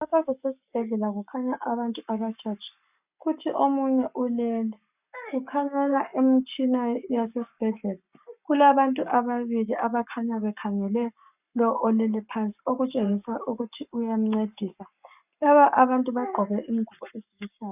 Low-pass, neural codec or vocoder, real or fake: 3.6 kHz; codec, 44.1 kHz, 7.8 kbps, Pupu-Codec; fake